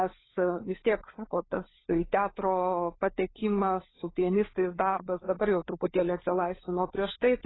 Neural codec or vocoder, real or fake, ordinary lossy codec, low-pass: codec, 16 kHz, 4 kbps, FunCodec, trained on LibriTTS, 50 frames a second; fake; AAC, 16 kbps; 7.2 kHz